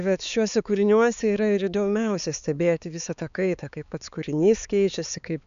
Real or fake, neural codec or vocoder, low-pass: fake; codec, 16 kHz, 4 kbps, X-Codec, HuBERT features, trained on balanced general audio; 7.2 kHz